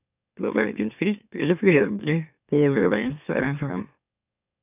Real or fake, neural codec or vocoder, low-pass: fake; autoencoder, 44.1 kHz, a latent of 192 numbers a frame, MeloTTS; 3.6 kHz